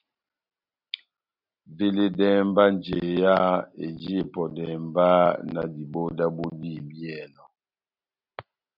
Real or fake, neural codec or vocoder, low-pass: real; none; 5.4 kHz